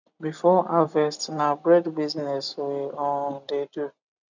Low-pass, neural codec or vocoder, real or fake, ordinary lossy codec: 7.2 kHz; none; real; none